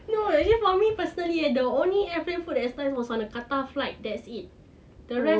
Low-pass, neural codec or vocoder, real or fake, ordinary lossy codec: none; none; real; none